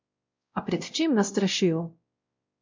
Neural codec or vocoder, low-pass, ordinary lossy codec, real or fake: codec, 16 kHz, 0.5 kbps, X-Codec, WavLM features, trained on Multilingual LibriSpeech; 7.2 kHz; MP3, 48 kbps; fake